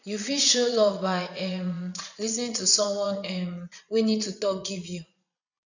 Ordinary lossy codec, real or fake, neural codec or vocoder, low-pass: none; fake; vocoder, 22.05 kHz, 80 mel bands, Vocos; 7.2 kHz